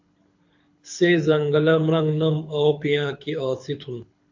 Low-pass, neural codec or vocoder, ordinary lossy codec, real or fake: 7.2 kHz; codec, 24 kHz, 6 kbps, HILCodec; MP3, 48 kbps; fake